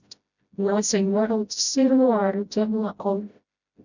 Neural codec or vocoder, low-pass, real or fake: codec, 16 kHz, 0.5 kbps, FreqCodec, smaller model; 7.2 kHz; fake